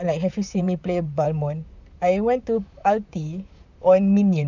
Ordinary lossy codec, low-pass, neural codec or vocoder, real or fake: none; 7.2 kHz; vocoder, 44.1 kHz, 128 mel bands, Pupu-Vocoder; fake